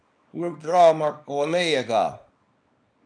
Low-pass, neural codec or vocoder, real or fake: 9.9 kHz; codec, 24 kHz, 0.9 kbps, WavTokenizer, small release; fake